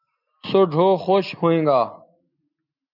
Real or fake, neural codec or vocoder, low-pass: real; none; 5.4 kHz